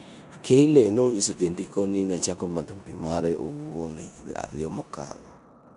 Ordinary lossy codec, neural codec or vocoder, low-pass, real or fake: none; codec, 16 kHz in and 24 kHz out, 0.9 kbps, LongCat-Audio-Codec, four codebook decoder; 10.8 kHz; fake